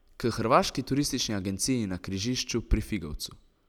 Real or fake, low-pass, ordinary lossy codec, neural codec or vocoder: real; 19.8 kHz; none; none